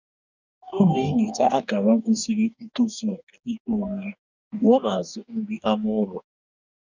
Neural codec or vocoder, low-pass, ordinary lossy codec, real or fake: codec, 44.1 kHz, 2.6 kbps, DAC; 7.2 kHz; none; fake